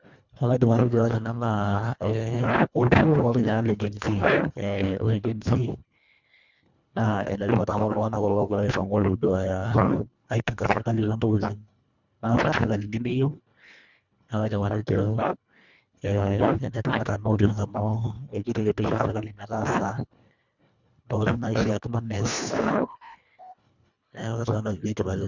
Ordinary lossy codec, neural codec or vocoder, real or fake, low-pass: none; codec, 24 kHz, 1.5 kbps, HILCodec; fake; 7.2 kHz